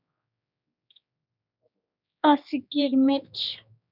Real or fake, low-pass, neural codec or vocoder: fake; 5.4 kHz; codec, 16 kHz, 2 kbps, X-Codec, HuBERT features, trained on general audio